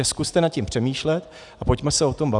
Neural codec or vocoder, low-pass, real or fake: none; 10.8 kHz; real